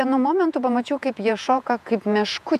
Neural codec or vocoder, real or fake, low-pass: vocoder, 48 kHz, 128 mel bands, Vocos; fake; 14.4 kHz